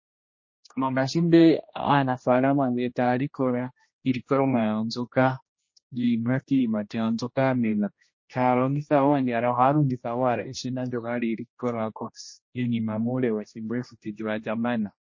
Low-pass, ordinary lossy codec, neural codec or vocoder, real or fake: 7.2 kHz; MP3, 32 kbps; codec, 16 kHz, 1 kbps, X-Codec, HuBERT features, trained on general audio; fake